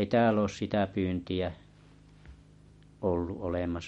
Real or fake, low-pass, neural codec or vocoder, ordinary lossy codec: real; 10.8 kHz; none; MP3, 48 kbps